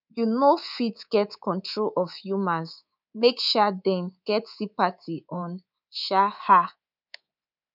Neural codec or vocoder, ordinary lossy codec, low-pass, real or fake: codec, 24 kHz, 3.1 kbps, DualCodec; none; 5.4 kHz; fake